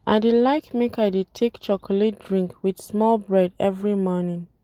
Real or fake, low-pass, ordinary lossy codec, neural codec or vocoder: real; 19.8 kHz; Opus, 24 kbps; none